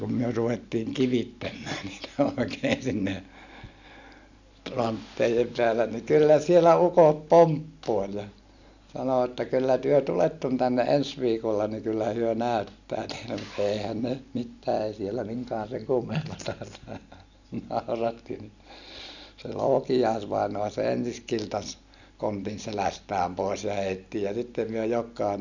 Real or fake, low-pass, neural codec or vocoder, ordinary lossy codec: real; 7.2 kHz; none; none